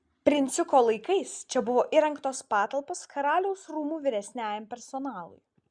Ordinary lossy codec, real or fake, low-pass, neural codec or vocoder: Opus, 64 kbps; real; 9.9 kHz; none